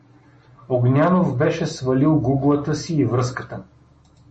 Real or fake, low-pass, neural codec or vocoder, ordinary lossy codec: real; 10.8 kHz; none; MP3, 32 kbps